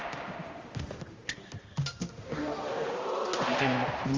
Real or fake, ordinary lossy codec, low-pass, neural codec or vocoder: fake; Opus, 32 kbps; 7.2 kHz; codec, 16 kHz, 1 kbps, X-Codec, HuBERT features, trained on balanced general audio